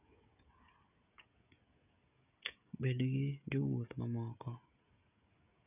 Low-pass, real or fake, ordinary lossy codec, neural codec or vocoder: 3.6 kHz; fake; none; codec, 16 kHz, 16 kbps, FreqCodec, smaller model